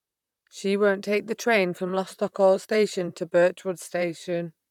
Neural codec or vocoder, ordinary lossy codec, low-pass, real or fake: vocoder, 44.1 kHz, 128 mel bands, Pupu-Vocoder; none; 19.8 kHz; fake